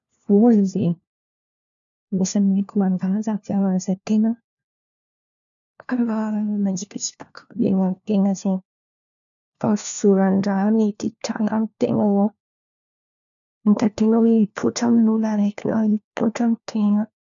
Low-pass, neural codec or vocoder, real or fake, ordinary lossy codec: 7.2 kHz; codec, 16 kHz, 1 kbps, FunCodec, trained on LibriTTS, 50 frames a second; fake; none